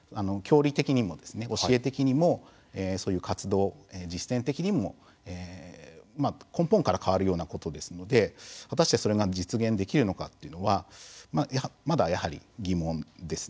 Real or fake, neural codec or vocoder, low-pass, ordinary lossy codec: real; none; none; none